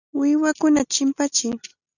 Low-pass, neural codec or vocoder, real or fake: 7.2 kHz; none; real